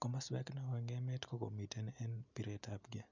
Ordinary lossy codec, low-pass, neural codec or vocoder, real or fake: none; 7.2 kHz; none; real